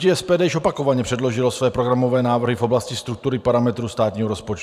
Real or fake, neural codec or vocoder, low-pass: fake; vocoder, 44.1 kHz, 128 mel bands every 512 samples, BigVGAN v2; 14.4 kHz